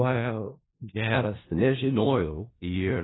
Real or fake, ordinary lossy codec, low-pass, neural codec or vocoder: fake; AAC, 16 kbps; 7.2 kHz; codec, 16 kHz in and 24 kHz out, 0.4 kbps, LongCat-Audio-Codec, four codebook decoder